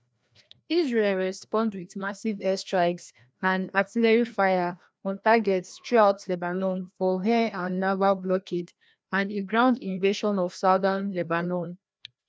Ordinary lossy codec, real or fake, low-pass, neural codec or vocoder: none; fake; none; codec, 16 kHz, 1 kbps, FreqCodec, larger model